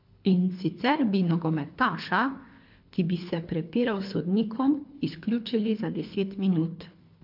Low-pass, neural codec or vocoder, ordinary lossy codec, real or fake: 5.4 kHz; codec, 24 kHz, 3 kbps, HILCodec; MP3, 48 kbps; fake